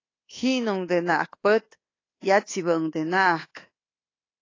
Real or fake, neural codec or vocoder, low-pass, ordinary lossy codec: fake; codec, 24 kHz, 1.2 kbps, DualCodec; 7.2 kHz; AAC, 32 kbps